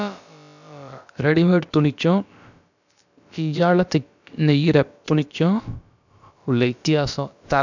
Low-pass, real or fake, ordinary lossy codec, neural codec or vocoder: 7.2 kHz; fake; none; codec, 16 kHz, about 1 kbps, DyCAST, with the encoder's durations